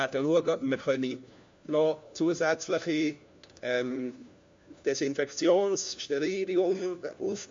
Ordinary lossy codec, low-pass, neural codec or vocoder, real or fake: MP3, 48 kbps; 7.2 kHz; codec, 16 kHz, 1 kbps, FunCodec, trained on LibriTTS, 50 frames a second; fake